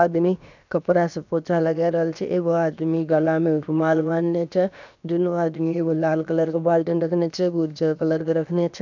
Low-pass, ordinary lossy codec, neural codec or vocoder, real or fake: 7.2 kHz; none; codec, 16 kHz, about 1 kbps, DyCAST, with the encoder's durations; fake